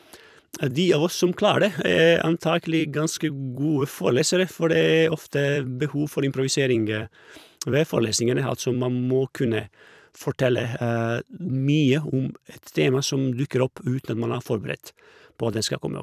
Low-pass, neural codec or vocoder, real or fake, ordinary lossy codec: 14.4 kHz; vocoder, 44.1 kHz, 128 mel bands every 256 samples, BigVGAN v2; fake; none